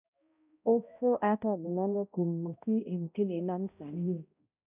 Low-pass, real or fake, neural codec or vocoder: 3.6 kHz; fake; codec, 16 kHz, 0.5 kbps, X-Codec, HuBERT features, trained on balanced general audio